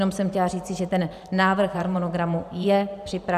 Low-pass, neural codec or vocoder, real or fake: 14.4 kHz; vocoder, 44.1 kHz, 128 mel bands every 512 samples, BigVGAN v2; fake